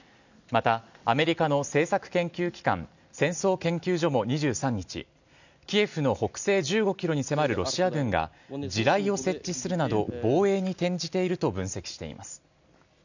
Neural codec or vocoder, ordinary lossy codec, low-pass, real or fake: none; none; 7.2 kHz; real